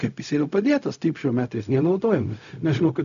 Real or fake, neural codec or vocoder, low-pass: fake; codec, 16 kHz, 0.4 kbps, LongCat-Audio-Codec; 7.2 kHz